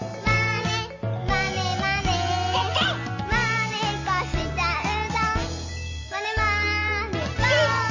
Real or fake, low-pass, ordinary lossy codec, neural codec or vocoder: real; 7.2 kHz; MP3, 32 kbps; none